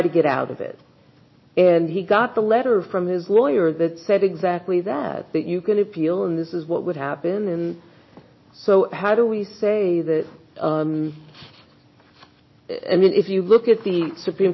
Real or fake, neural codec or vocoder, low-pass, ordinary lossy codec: real; none; 7.2 kHz; MP3, 24 kbps